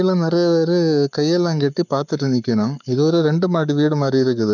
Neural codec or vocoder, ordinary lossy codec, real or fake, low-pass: codec, 44.1 kHz, 7.8 kbps, Pupu-Codec; none; fake; 7.2 kHz